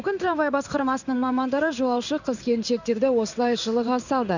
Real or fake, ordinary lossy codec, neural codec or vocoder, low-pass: fake; none; codec, 16 kHz in and 24 kHz out, 1 kbps, XY-Tokenizer; 7.2 kHz